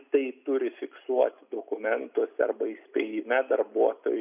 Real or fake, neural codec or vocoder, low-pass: real; none; 3.6 kHz